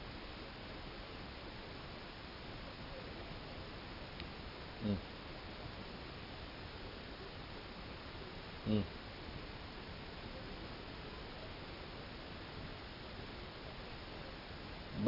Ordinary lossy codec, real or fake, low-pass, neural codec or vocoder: none; real; 5.4 kHz; none